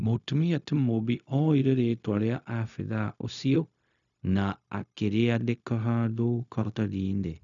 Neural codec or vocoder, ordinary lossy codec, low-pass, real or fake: codec, 16 kHz, 0.4 kbps, LongCat-Audio-Codec; none; 7.2 kHz; fake